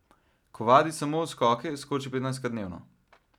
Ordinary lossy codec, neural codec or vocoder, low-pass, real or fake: none; none; 19.8 kHz; real